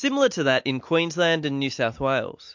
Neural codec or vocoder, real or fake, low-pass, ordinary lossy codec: none; real; 7.2 kHz; MP3, 48 kbps